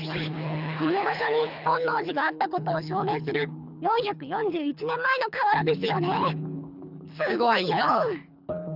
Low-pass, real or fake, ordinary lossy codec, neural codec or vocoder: 5.4 kHz; fake; none; codec, 24 kHz, 3 kbps, HILCodec